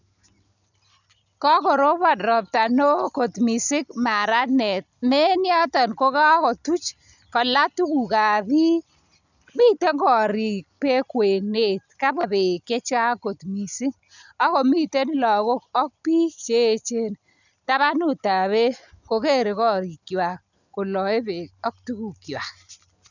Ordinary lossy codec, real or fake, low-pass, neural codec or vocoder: none; real; 7.2 kHz; none